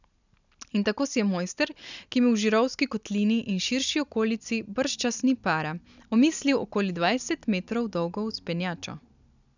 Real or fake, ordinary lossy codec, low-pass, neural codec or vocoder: real; none; 7.2 kHz; none